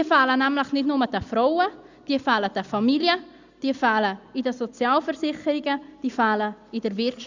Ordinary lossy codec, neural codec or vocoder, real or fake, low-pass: Opus, 64 kbps; none; real; 7.2 kHz